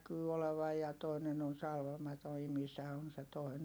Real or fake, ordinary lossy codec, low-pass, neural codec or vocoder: real; none; none; none